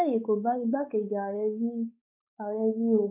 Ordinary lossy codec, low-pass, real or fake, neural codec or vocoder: none; 3.6 kHz; fake; codec, 16 kHz, 4 kbps, X-Codec, WavLM features, trained on Multilingual LibriSpeech